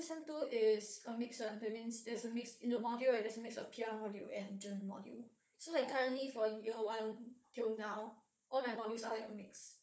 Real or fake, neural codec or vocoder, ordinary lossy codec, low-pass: fake; codec, 16 kHz, 4 kbps, FunCodec, trained on Chinese and English, 50 frames a second; none; none